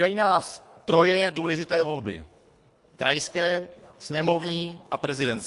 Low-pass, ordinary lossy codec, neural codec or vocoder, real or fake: 10.8 kHz; AAC, 64 kbps; codec, 24 kHz, 1.5 kbps, HILCodec; fake